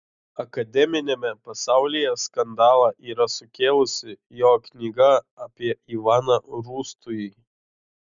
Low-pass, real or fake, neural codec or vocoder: 7.2 kHz; real; none